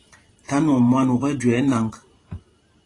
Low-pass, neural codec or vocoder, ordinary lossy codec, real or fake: 10.8 kHz; vocoder, 44.1 kHz, 128 mel bands every 512 samples, BigVGAN v2; AAC, 32 kbps; fake